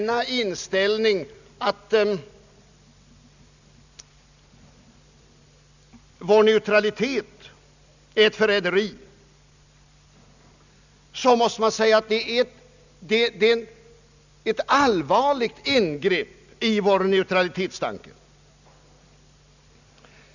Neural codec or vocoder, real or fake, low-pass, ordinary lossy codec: none; real; 7.2 kHz; none